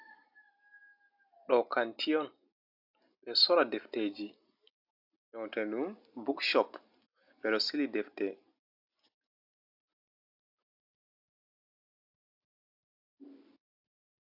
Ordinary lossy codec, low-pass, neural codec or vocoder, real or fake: AAC, 48 kbps; 5.4 kHz; none; real